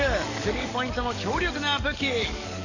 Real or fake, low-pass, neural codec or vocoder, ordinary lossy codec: fake; 7.2 kHz; codec, 44.1 kHz, 7.8 kbps, DAC; none